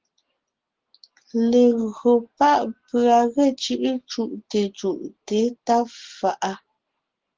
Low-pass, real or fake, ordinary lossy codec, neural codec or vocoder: 7.2 kHz; real; Opus, 16 kbps; none